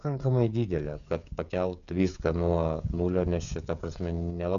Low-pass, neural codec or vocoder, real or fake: 7.2 kHz; codec, 16 kHz, 16 kbps, FreqCodec, smaller model; fake